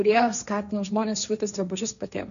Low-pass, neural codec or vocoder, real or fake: 7.2 kHz; codec, 16 kHz, 1.1 kbps, Voila-Tokenizer; fake